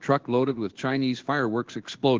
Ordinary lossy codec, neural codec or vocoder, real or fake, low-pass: Opus, 16 kbps; codec, 16 kHz in and 24 kHz out, 1 kbps, XY-Tokenizer; fake; 7.2 kHz